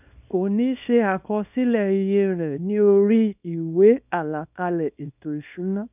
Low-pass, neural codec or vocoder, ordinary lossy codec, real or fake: 3.6 kHz; codec, 24 kHz, 0.9 kbps, WavTokenizer, small release; none; fake